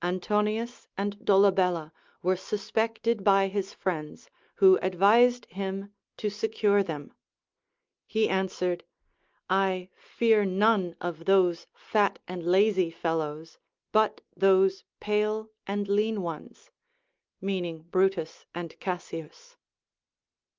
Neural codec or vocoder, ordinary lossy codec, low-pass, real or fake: none; Opus, 24 kbps; 7.2 kHz; real